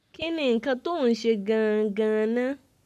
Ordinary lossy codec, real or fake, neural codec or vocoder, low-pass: AAC, 96 kbps; fake; codec, 44.1 kHz, 7.8 kbps, Pupu-Codec; 14.4 kHz